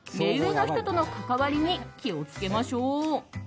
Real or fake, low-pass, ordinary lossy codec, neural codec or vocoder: real; none; none; none